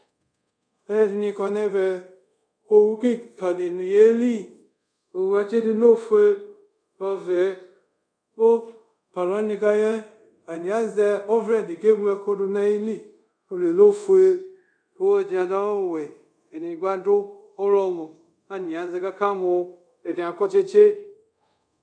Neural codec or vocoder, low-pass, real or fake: codec, 24 kHz, 0.5 kbps, DualCodec; 9.9 kHz; fake